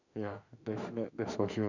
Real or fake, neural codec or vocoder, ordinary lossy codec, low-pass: fake; autoencoder, 48 kHz, 32 numbers a frame, DAC-VAE, trained on Japanese speech; none; 7.2 kHz